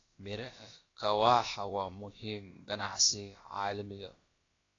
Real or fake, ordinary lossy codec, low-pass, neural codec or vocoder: fake; AAC, 32 kbps; 7.2 kHz; codec, 16 kHz, about 1 kbps, DyCAST, with the encoder's durations